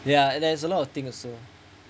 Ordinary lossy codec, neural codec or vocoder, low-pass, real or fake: none; none; none; real